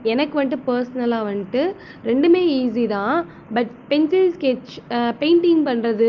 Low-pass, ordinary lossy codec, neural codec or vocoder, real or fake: 7.2 kHz; Opus, 32 kbps; none; real